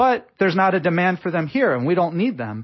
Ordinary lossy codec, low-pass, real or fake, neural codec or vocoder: MP3, 24 kbps; 7.2 kHz; real; none